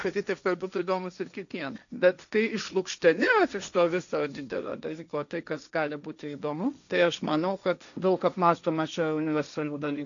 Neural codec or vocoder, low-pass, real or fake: codec, 16 kHz, 1.1 kbps, Voila-Tokenizer; 7.2 kHz; fake